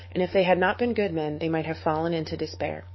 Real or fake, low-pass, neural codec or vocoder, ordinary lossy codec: fake; 7.2 kHz; codec, 44.1 kHz, 7.8 kbps, DAC; MP3, 24 kbps